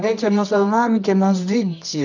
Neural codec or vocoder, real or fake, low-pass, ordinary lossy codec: codec, 24 kHz, 0.9 kbps, WavTokenizer, medium music audio release; fake; 7.2 kHz; none